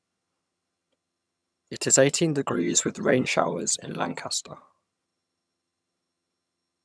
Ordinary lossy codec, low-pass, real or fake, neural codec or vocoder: none; none; fake; vocoder, 22.05 kHz, 80 mel bands, HiFi-GAN